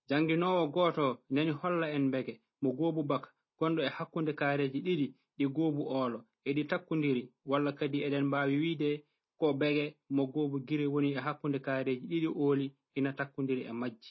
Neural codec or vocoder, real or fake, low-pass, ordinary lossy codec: none; real; 7.2 kHz; MP3, 24 kbps